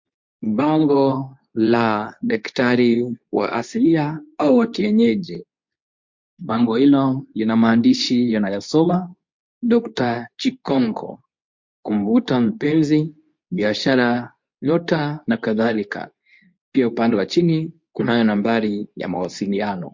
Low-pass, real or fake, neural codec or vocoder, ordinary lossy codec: 7.2 kHz; fake; codec, 24 kHz, 0.9 kbps, WavTokenizer, medium speech release version 2; MP3, 48 kbps